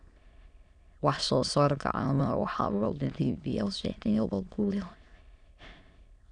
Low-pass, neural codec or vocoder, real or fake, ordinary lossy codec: 9.9 kHz; autoencoder, 22.05 kHz, a latent of 192 numbers a frame, VITS, trained on many speakers; fake; none